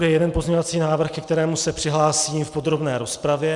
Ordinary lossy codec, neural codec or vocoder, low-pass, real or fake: Opus, 64 kbps; none; 10.8 kHz; real